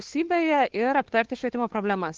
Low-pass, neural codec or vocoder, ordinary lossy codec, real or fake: 7.2 kHz; codec, 16 kHz, 2 kbps, FunCodec, trained on Chinese and English, 25 frames a second; Opus, 16 kbps; fake